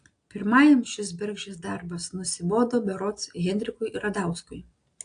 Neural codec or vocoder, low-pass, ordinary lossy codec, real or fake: none; 9.9 kHz; AAC, 48 kbps; real